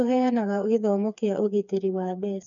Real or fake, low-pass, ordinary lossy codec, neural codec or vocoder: fake; 7.2 kHz; none; codec, 16 kHz, 4 kbps, FreqCodec, smaller model